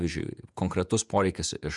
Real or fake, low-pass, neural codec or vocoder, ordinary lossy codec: fake; 10.8 kHz; vocoder, 48 kHz, 128 mel bands, Vocos; MP3, 96 kbps